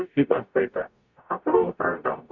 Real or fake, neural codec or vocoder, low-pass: fake; codec, 44.1 kHz, 0.9 kbps, DAC; 7.2 kHz